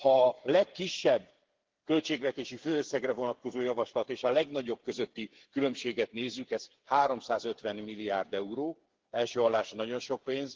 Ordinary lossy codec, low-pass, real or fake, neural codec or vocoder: Opus, 16 kbps; 7.2 kHz; fake; codec, 16 kHz, 8 kbps, FreqCodec, smaller model